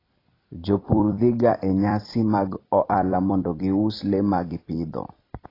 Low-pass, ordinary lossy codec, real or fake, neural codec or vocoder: 5.4 kHz; AAC, 24 kbps; fake; vocoder, 44.1 kHz, 128 mel bands every 256 samples, BigVGAN v2